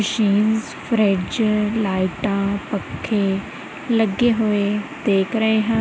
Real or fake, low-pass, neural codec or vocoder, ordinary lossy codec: real; none; none; none